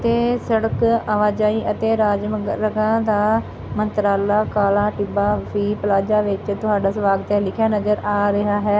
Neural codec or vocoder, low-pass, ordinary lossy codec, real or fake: none; none; none; real